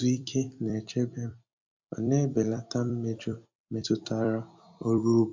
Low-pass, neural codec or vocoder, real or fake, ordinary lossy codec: 7.2 kHz; vocoder, 44.1 kHz, 128 mel bands every 256 samples, BigVGAN v2; fake; MP3, 64 kbps